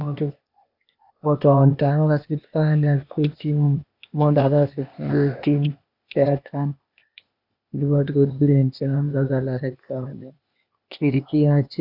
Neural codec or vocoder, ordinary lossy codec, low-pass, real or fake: codec, 16 kHz, 0.8 kbps, ZipCodec; none; 5.4 kHz; fake